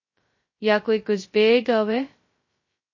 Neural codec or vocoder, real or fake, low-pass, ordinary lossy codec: codec, 16 kHz, 0.2 kbps, FocalCodec; fake; 7.2 kHz; MP3, 32 kbps